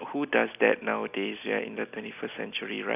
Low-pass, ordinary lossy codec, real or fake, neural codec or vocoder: 3.6 kHz; none; real; none